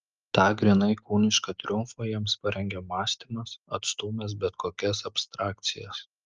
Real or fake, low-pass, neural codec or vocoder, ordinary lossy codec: real; 7.2 kHz; none; Opus, 24 kbps